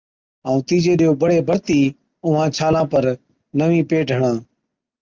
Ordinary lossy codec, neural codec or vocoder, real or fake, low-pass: Opus, 32 kbps; none; real; 7.2 kHz